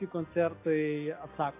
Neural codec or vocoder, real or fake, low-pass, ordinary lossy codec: none; real; 3.6 kHz; AAC, 24 kbps